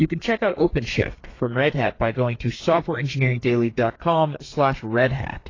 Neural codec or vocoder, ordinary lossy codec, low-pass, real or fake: codec, 32 kHz, 1.9 kbps, SNAC; AAC, 32 kbps; 7.2 kHz; fake